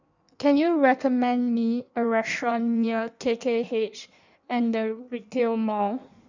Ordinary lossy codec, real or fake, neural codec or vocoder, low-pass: none; fake; codec, 16 kHz in and 24 kHz out, 1.1 kbps, FireRedTTS-2 codec; 7.2 kHz